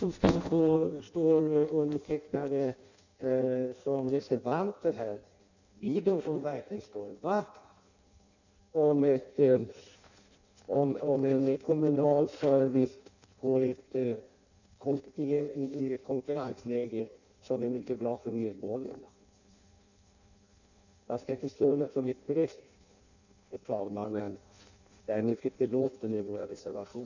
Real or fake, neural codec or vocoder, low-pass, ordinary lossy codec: fake; codec, 16 kHz in and 24 kHz out, 0.6 kbps, FireRedTTS-2 codec; 7.2 kHz; none